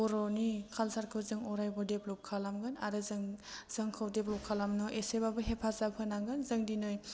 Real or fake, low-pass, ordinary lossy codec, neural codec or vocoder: real; none; none; none